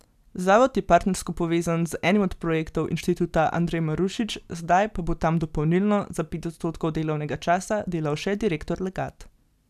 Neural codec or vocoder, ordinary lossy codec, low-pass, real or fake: none; none; 14.4 kHz; real